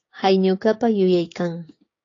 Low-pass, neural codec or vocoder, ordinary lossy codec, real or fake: 7.2 kHz; codec, 16 kHz, 6 kbps, DAC; AAC, 32 kbps; fake